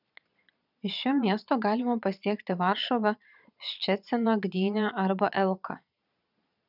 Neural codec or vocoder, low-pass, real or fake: vocoder, 22.05 kHz, 80 mel bands, Vocos; 5.4 kHz; fake